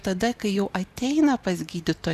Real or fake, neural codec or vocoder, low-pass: fake; vocoder, 44.1 kHz, 128 mel bands every 512 samples, BigVGAN v2; 14.4 kHz